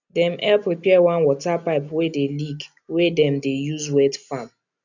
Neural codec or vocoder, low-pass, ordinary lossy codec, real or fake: none; 7.2 kHz; none; real